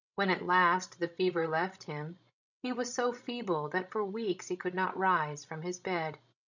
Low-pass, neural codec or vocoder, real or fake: 7.2 kHz; codec, 16 kHz, 16 kbps, FreqCodec, larger model; fake